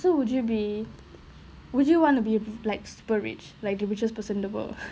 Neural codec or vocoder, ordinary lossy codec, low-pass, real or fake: none; none; none; real